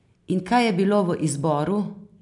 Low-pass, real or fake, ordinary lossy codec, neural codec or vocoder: 10.8 kHz; real; none; none